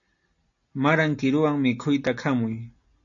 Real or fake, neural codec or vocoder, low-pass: real; none; 7.2 kHz